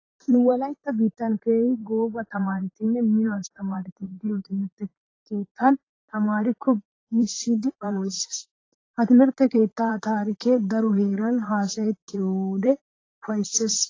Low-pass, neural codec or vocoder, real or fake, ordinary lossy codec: 7.2 kHz; vocoder, 44.1 kHz, 128 mel bands, Pupu-Vocoder; fake; AAC, 32 kbps